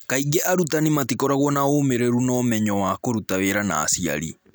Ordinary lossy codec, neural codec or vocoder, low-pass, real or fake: none; none; none; real